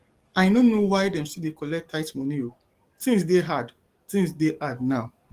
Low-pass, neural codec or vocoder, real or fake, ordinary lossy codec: 14.4 kHz; codec, 44.1 kHz, 7.8 kbps, DAC; fake; Opus, 24 kbps